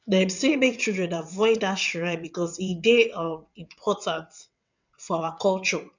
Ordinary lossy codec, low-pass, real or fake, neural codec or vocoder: none; 7.2 kHz; fake; vocoder, 22.05 kHz, 80 mel bands, WaveNeXt